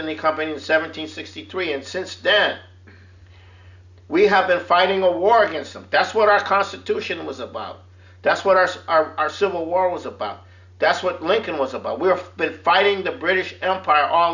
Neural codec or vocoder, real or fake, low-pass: none; real; 7.2 kHz